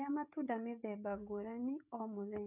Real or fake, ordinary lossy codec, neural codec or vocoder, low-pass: fake; none; codec, 16 kHz, 16 kbps, FreqCodec, smaller model; 3.6 kHz